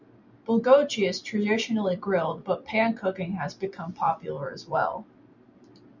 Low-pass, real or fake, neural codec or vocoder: 7.2 kHz; real; none